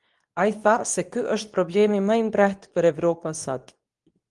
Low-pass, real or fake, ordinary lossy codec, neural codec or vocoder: 10.8 kHz; fake; Opus, 24 kbps; codec, 24 kHz, 0.9 kbps, WavTokenizer, medium speech release version 2